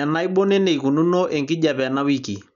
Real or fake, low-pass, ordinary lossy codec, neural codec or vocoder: real; 7.2 kHz; none; none